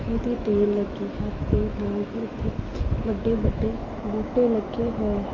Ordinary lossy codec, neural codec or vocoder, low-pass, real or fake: Opus, 24 kbps; autoencoder, 48 kHz, 128 numbers a frame, DAC-VAE, trained on Japanese speech; 7.2 kHz; fake